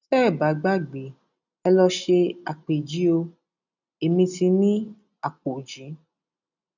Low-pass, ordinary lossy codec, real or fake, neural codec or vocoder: 7.2 kHz; none; real; none